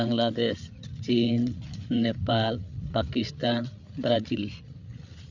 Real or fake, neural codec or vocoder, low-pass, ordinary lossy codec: fake; codec, 16 kHz, 8 kbps, FreqCodec, larger model; 7.2 kHz; none